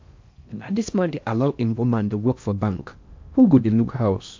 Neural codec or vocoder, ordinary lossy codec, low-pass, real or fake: codec, 16 kHz in and 24 kHz out, 0.6 kbps, FocalCodec, streaming, 4096 codes; MP3, 48 kbps; 7.2 kHz; fake